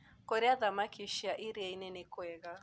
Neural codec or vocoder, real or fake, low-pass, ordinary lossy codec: none; real; none; none